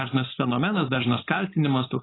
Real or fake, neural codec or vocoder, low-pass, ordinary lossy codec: fake; codec, 16 kHz, 4.8 kbps, FACodec; 7.2 kHz; AAC, 16 kbps